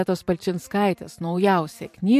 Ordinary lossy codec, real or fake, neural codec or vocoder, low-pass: MP3, 64 kbps; fake; vocoder, 44.1 kHz, 128 mel bands every 512 samples, BigVGAN v2; 14.4 kHz